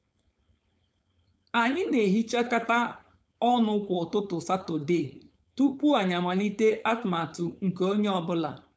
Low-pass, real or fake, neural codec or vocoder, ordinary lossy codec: none; fake; codec, 16 kHz, 4.8 kbps, FACodec; none